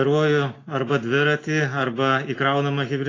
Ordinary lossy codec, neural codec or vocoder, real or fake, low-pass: AAC, 32 kbps; none; real; 7.2 kHz